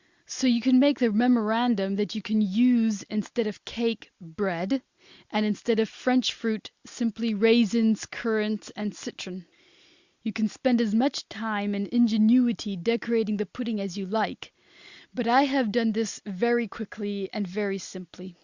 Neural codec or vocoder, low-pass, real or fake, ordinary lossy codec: none; 7.2 kHz; real; Opus, 64 kbps